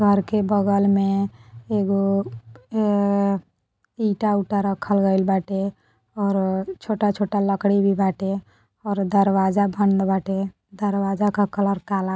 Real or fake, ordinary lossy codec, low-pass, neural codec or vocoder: real; none; none; none